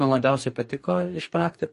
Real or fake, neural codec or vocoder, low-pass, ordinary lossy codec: fake; codec, 44.1 kHz, 2.6 kbps, DAC; 14.4 kHz; MP3, 48 kbps